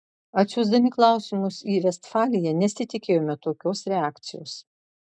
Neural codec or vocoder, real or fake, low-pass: none; real; 9.9 kHz